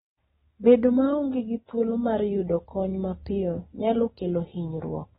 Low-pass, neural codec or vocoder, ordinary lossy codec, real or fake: 19.8 kHz; none; AAC, 16 kbps; real